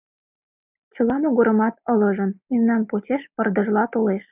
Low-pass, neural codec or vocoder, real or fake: 3.6 kHz; none; real